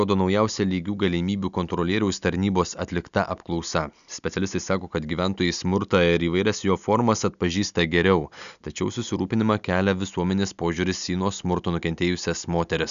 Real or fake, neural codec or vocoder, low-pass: real; none; 7.2 kHz